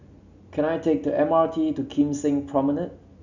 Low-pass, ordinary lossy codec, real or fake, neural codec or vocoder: 7.2 kHz; none; real; none